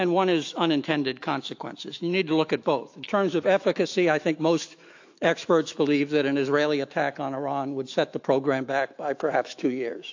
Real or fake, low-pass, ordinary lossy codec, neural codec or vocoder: fake; 7.2 kHz; AAC, 48 kbps; autoencoder, 48 kHz, 128 numbers a frame, DAC-VAE, trained on Japanese speech